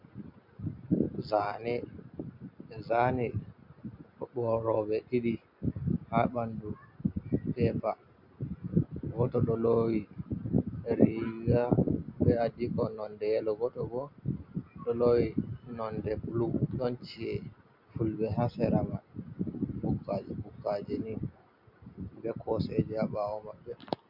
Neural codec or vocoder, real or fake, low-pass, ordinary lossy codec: none; real; 5.4 kHz; MP3, 48 kbps